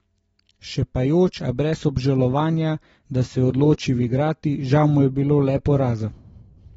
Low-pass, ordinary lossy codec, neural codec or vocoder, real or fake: 19.8 kHz; AAC, 24 kbps; none; real